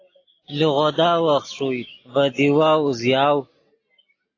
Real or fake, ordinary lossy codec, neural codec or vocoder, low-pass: real; AAC, 32 kbps; none; 7.2 kHz